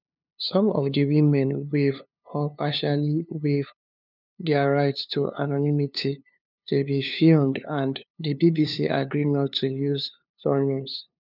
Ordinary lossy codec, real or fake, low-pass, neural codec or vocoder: none; fake; 5.4 kHz; codec, 16 kHz, 2 kbps, FunCodec, trained on LibriTTS, 25 frames a second